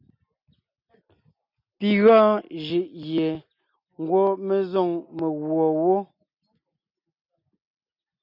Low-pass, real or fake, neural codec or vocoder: 5.4 kHz; real; none